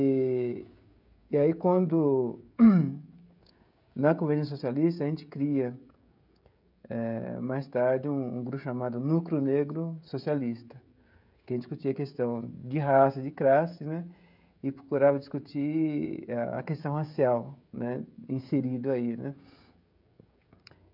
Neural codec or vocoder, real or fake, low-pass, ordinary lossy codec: codec, 16 kHz, 16 kbps, FreqCodec, smaller model; fake; 5.4 kHz; none